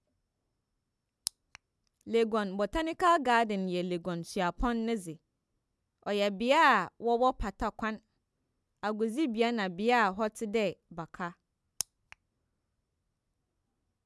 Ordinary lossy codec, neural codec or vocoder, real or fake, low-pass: none; none; real; none